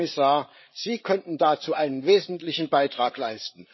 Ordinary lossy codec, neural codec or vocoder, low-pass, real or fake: MP3, 24 kbps; codec, 16 kHz, 8 kbps, FreqCodec, larger model; 7.2 kHz; fake